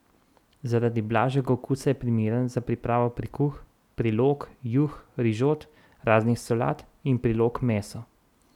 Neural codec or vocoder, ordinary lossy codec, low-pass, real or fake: none; none; 19.8 kHz; real